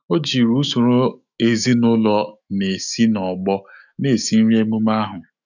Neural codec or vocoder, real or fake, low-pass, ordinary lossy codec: autoencoder, 48 kHz, 128 numbers a frame, DAC-VAE, trained on Japanese speech; fake; 7.2 kHz; none